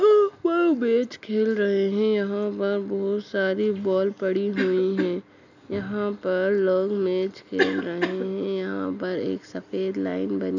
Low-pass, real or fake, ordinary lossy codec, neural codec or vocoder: 7.2 kHz; real; none; none